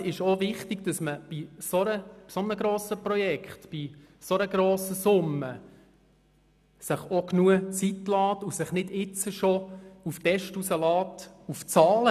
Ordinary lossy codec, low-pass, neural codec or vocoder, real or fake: none; 14.4 kHz; none; real